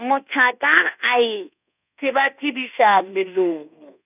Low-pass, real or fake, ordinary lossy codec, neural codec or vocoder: 3.6 kHz; fake; none; codec, 24 kHz, 1.2 kbps, DualCodec